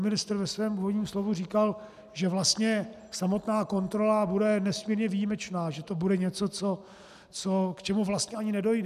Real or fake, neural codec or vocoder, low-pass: real; none; 14.4 kHz